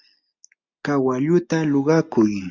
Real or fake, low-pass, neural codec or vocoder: real; 7.2 kHz; none